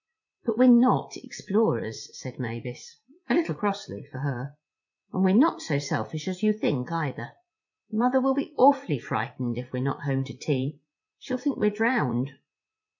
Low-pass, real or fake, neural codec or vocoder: 7.2 kHz; fake; vocoder, 44.1 kHz, 80 mel bands, Vocos